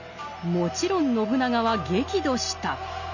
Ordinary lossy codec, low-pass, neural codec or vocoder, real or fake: none; 7.2 kHz; none; real